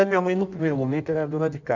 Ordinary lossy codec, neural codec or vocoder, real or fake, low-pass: none; codec, 16 kHz in and 24 kHz out, 1.1 kbps, FireRedTTS-2 codec; fake; 7.2 kHz